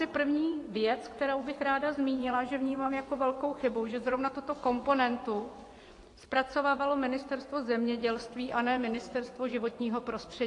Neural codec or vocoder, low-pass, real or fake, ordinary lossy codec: vocoder, 24 kHz, 100 mel bands, Vocos; 10.8 kHz; fake; AAC, 48 kbps